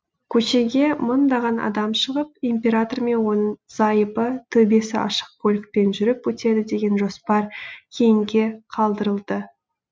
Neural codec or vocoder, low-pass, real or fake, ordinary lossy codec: none; none; real; none